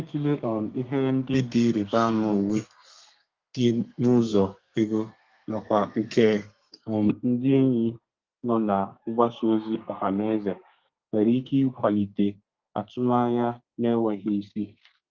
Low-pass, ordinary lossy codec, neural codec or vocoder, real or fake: 7.2 kHz; Opus, 32 kbps; codec, 32 kHz, 1.9 kbps, SNAC; fake